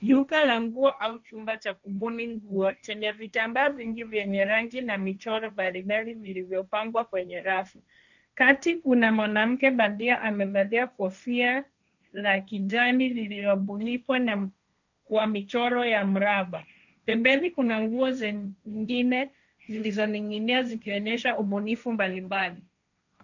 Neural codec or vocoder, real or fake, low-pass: codec, 16 kHz, 1.1 kbps, Voila-Tokenizer; fake; 7.2 kHz